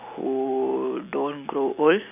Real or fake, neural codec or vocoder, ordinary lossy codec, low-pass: real; none; none; 3.6 kHz